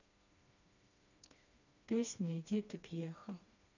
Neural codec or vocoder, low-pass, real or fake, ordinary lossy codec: codec, 16 kHz, 2 kbps, FreqCodec, smaller model; 7.2 kHz; fake; none